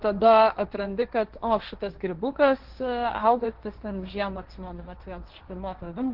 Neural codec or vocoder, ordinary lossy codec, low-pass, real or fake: codec, 16 kHz, 1.1 kbps, Voila-Tokenizer; Opus, 16 kbps; 5.4 kHz; fake